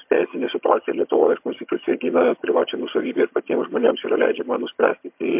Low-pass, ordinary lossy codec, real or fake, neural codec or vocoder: 3.6 kHz; MP3, 32 kbps; fake; vocoder, 22.05 kHz, 80 mel bands, HiFi-GAN